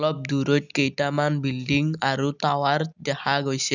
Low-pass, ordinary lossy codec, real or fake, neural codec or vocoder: 7.2 kHz; none; real; none